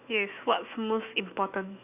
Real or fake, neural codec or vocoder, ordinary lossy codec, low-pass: fake; autoencoder, 48 kHz, 128 numbers a frame, DAC-VAE, trained on Japanese speech; none; 3.6 kHz